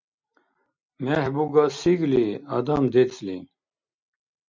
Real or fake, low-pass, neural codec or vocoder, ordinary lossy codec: real; 7.2 kHz; none; MP3, 64 kbps